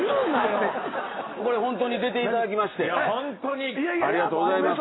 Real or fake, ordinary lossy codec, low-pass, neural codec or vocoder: real; AAC, 16 kbps; 7.2 kHz; none